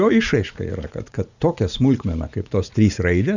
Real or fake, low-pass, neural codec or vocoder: fake; 7.2 kHz; vocoder, 44.1 kHz, 80 mel bands, Vocos